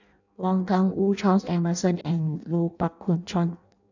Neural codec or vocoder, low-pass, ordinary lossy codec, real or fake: codec, 16 kHz in and 24 kHz out, 0.6 kbps, FireRedTTS-2 codec; 7.2 kHz; none; fake